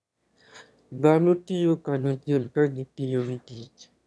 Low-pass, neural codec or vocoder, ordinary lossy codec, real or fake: none; autoencoder, 22.05 kHz, a latent of 192 numbers a frame, VITS, trained on one speaker; none; fake